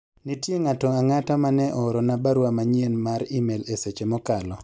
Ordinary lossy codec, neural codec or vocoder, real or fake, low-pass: none; none; real; none